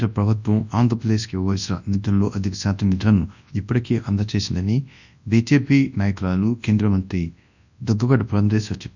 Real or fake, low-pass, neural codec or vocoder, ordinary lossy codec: fake; 7.2 kHz; codec, 24 kHz, 0.9 kbps, WavTokenizer, large speech release; none